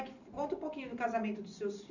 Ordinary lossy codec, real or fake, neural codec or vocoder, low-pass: none; real; none; 7.2 kHz